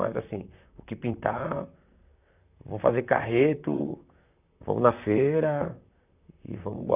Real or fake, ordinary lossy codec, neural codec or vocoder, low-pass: fake; none; vocoder, 44.1 kHz, 128 mel bands, Pupu-Vocoder; 3.6 kHz